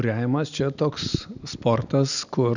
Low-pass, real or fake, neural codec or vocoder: 7.2 kHz; real; none